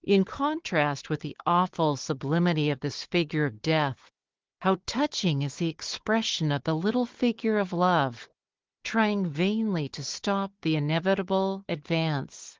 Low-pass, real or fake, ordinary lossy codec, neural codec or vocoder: 7.2 kHz; fake; Opus, 16 kbps; codec, 16 kHz, 4 kbps, FunCodec, trained on Chinese and English, 50 frames a second